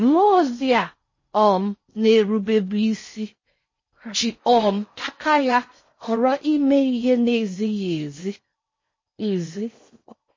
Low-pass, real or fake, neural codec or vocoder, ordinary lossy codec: 7.2 kHz; fake; codec, 16 kHz in and 24 kHz out, 0.6 kbps, FocalCodec, streaming, 4096 codes; MP3, 32 kbps